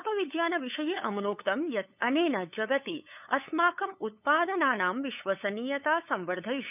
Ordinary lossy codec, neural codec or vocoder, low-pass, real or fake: none; codec, 16 kHz, 8 kbps, FunCodec, trained on LibriTTS, 25 frames a second; 3.6 kHz; fake